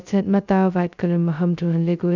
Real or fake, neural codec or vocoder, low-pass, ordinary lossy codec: fake; codec, 16 kHz, 0.2 kbps, FocalCodec; 7.2 kHz; none